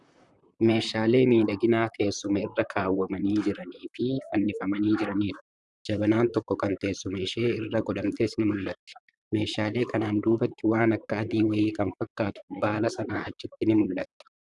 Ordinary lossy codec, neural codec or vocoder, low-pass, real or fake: Opus, 64 kbps; vocoder, 44.1 kHz, 128 mel bands, Pupu-Vocoder; 10.8 kHz; fake